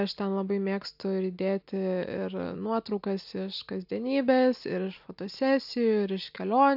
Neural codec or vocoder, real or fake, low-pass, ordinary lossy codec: none; real; 5.4 kHz; AAC, 48 kbps